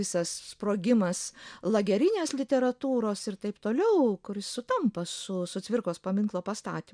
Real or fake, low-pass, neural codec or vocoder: real; 9.9 kHz; none